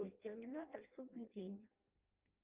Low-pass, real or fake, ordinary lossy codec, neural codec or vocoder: 3.6 kHz; fake; Opus, 24 kbps; codec, 16 kHz in and 24 kHz out, 0.6 kbps, FireRedTTS-2 codec